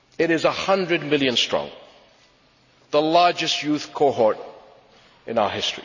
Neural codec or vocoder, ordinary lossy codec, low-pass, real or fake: none; none; 7.2 kHz; real